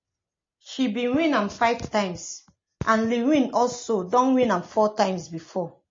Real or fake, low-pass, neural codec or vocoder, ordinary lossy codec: real; 7.2 kHz; none; MP3, 32 kbps